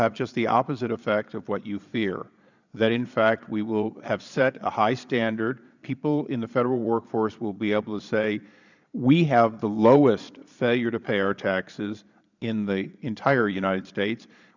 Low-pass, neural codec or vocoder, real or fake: 7.2 kHz; vocoder, 22.05 kHz, 80 mel bands, Vocos; fake